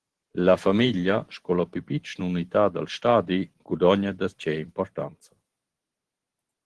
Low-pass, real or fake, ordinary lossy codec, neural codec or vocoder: 10.8 kHz; fake; Opus, 16 kbps; vocoder, 44.1 kHz, 128 mel bands every 512 samples, BigVGAN v2